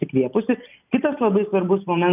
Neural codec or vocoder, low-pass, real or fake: none; 3.6 kHz; real